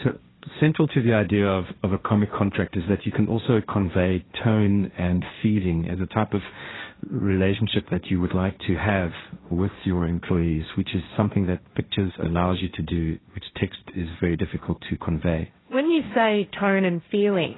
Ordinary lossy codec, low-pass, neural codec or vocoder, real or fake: AAC, 16 kbps; 7.2 kHz; codec, 16 kHz, 1.1 kbps, Voila-Tokenizer; fake